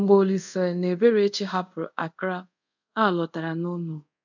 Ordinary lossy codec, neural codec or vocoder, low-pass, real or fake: none; codec, 24 kHz, 0.5 kbps, DualCodec; 7.2 kHz; fake